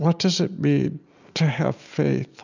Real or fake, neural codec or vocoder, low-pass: real; none; 7.2 kHz